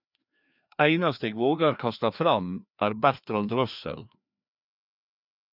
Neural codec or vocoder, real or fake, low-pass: codec, 16 kHz, 2 kbps, FreqCodec, larger model; fake; 5.4 kHz